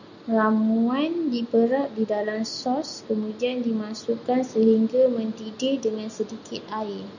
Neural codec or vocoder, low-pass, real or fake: none; 7.2 kHz; real